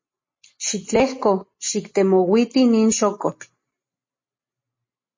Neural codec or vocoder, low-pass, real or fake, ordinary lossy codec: none; 7.2 kHz; real; MP3, 32 kbps